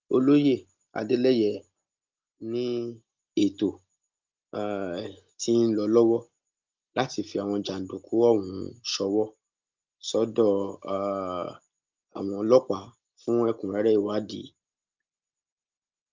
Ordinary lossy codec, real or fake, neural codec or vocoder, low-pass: Opus, 32 kbps; real; none; 7.2 kHz